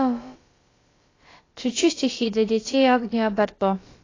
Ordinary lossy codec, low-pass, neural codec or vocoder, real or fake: AAC, 32 kbps; 7.2 kHz; codec, 16 kHz, about 1 kbps, DyCAST, with the encoder's durations; fake